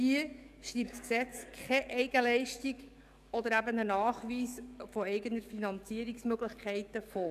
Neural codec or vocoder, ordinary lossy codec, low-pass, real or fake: codec, 44.1 kHz, 7.8 kbps, DAC; none; 14.4 kHz; fake